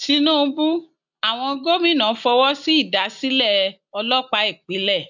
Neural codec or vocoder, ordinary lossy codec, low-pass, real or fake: none; none; 7.2 kHz; real